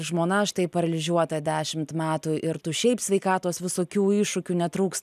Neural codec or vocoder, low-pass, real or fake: none; 14.4 kHz; real